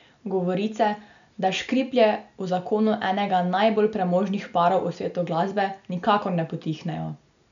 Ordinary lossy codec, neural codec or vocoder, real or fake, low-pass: none; none; real; 7.2 kHz